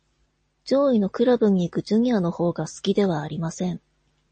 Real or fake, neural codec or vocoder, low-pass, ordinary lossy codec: real; none; 9.9 kHz; MP3, 32 kbps